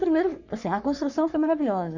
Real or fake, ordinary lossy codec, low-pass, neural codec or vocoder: fake; none; 7.2 kHz; codec, 44.1 kHz, 3.4 kbps, Pupu-Codec